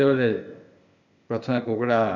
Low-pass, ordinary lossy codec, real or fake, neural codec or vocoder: 7.2 kHz; none; fake; codec, 16 kHz, 0.8 kbps, ZipCodec